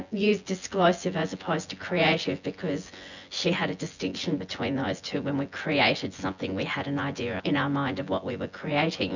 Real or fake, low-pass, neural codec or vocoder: fake; 7.2 kHz; vocoder, 24 kHz, 100 mel bands, Vocos